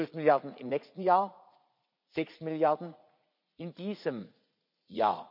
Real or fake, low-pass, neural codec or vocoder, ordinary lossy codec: fake; 5.4 kHz; vocoder, 44.1 kHz, 80 mel bands, Vocos; none